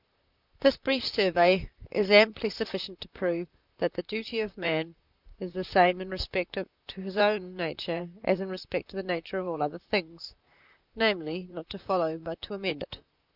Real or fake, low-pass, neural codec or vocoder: fake; 5.4 kHz; vocoder, 44.1 kHz, 128 mel bands, Pupu-Vocoder